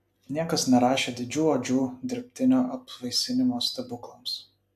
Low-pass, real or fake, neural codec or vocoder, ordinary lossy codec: 14.4 kHz; real; none; AAC, 96 kbps